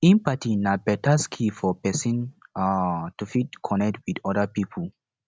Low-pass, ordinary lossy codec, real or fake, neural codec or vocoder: none; none; real; none